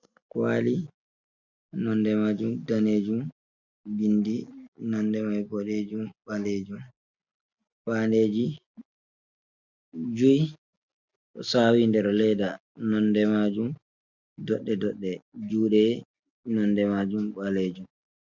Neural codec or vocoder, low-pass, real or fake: none; 7.2 kHz; real